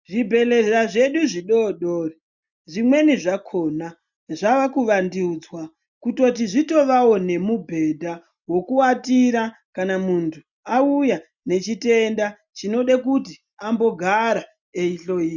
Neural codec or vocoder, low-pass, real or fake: none; 7.2 kHz; real